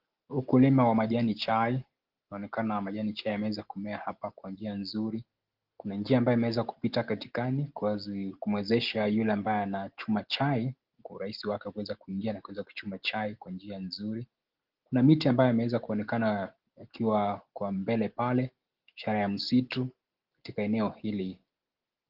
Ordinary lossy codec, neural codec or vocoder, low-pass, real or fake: Opus, 16 kbps; none; 5.4 kHz; real